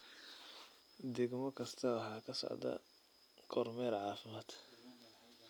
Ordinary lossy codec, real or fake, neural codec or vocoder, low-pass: none; real; none; none